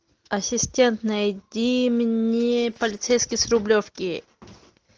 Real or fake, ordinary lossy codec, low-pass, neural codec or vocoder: real; Opus, 24 kbps; 7.2 kHz; none